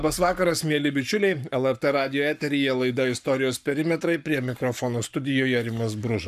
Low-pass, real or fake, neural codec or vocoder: 14.4 kHz; fake; codec, 44.1 kHz, 7.8 kbps, Pupu-Codec